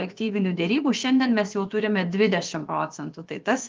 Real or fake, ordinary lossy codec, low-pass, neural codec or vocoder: fake; Opus, 24 kbps; 7.2 kHz; codec, 16 kHz, about 1 kbps, DyCAST, with the encoder's durations